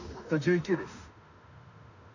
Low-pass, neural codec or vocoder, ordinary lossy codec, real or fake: 7.2 kHz; autoencoder, 48 kHz, 32 numbers a frame, DAC-VAE, trained on Japanese speech; none; fake